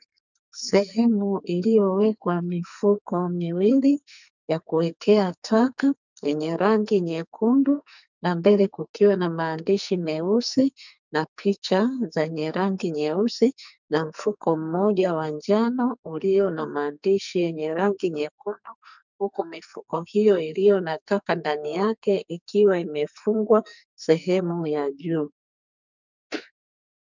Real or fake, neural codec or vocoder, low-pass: fake; codec, 44.1 kHz, 2.6 kbps, SNAC; 7.2 kHz